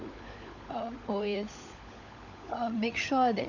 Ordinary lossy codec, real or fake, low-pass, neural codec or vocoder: none; fake; 7.2 kHz; codec, 16 kHz, 16 kbps, FunCodec, trained on LibriTTS, 50 frames a second